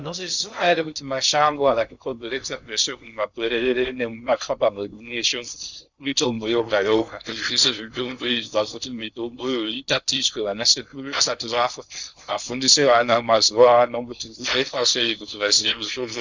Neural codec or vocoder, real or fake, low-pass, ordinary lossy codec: codec, 16 kHz in and 24 kHz out, 0.6 kbps, FocalCodec, streaming, 2048 codes; fake; 7.2 kHz; Opus, 64 kbps